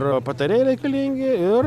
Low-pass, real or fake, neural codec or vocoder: 14.4 kHz; real; none